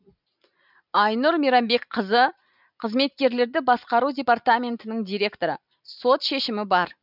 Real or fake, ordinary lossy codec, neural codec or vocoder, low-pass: real; none; none; 5.4 kHz